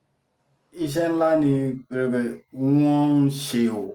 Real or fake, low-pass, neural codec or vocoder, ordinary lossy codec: real; 19.8 kHz; none; Opus, 32 kbps